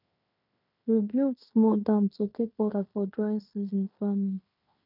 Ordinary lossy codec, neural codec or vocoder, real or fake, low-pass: none; codec, 16 kHz in and 24 kHz out, 0.9 kbps, LongCat-Audio-Codec, fine tuned four codebook decoder; fake; 5.4 kHz